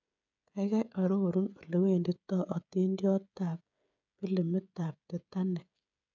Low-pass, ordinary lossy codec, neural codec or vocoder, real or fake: 7.2 kHz; none; codec, 16 kHz, 16 kbps, FreqCodec, smaller model; fake